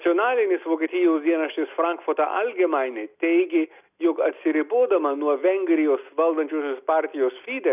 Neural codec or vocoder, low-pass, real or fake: none; 3.6 kHz; real